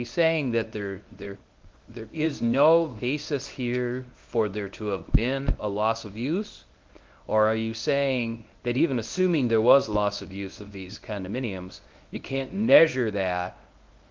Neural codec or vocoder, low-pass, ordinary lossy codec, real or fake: codec, 24 kHz, 0.9 kbps, WavTokenizer, medium speech release version 1; 7.2 kHz; Opus, 32 kbps; fake